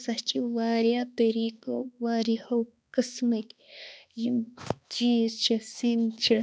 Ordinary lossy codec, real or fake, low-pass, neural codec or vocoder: none; fake; none; codec, 16 kHz, 2 kbps, X-Codec, HuBERT features, trained on balanced general audio